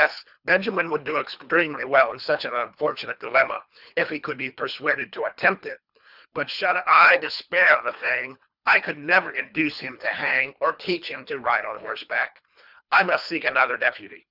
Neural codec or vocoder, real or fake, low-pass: codec, 24 kHz, 3 kbps, HILCodec; fake; 5.4 kHz